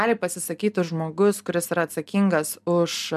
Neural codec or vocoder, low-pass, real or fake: none; 14.4 kHz; real